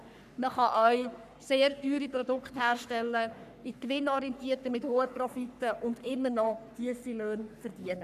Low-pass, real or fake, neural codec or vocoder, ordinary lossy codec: 14.4 kHz; fake; codec, 44.1 kHz, 3.4 kbps, Pupu-Codec; none